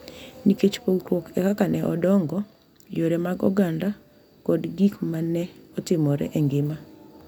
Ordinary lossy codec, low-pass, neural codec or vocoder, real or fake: none; 19.8 kHz; none; real